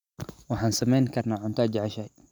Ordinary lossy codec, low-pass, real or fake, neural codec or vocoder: none; 19.8 kHz; real; none